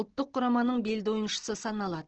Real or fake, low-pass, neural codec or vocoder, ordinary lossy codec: real; 7.2 kHz; none; Opus, 16 kbps